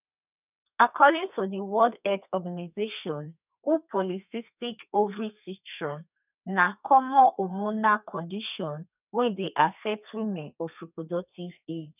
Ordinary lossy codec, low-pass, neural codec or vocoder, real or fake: none; 3.6 kHz; codec, 32 kHz, 1.9 kbps, SNAC; fake